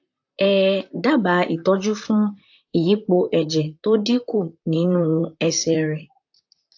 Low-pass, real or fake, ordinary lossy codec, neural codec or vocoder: 7.2 kHz; fake; AAC, 48 kbps; vocoder, 44.1 kHz, 128 mel bands, Pupu-Vocoder